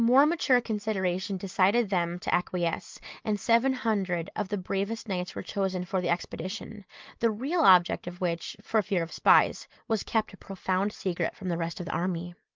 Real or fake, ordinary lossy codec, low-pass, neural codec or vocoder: real; Opus, 32 kbps; 7.2 kHz; none